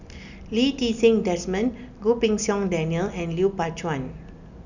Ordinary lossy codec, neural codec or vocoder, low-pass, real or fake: none; none; 7.2 kHz; real